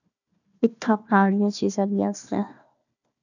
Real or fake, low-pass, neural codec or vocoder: fake; 7.2 kHz; codec, 16 kHz, 1 kbps, FunCodec, trained on Chinese and English, 50 frames a second